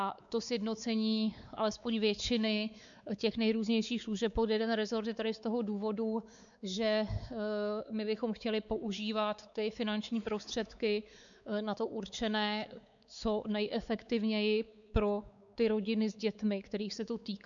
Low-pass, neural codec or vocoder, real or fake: 7.2 kHz; codec, 16 kHz, 4 kbps, X-Codec, WavLM features, trained on Multilingual LibriSpeech; fake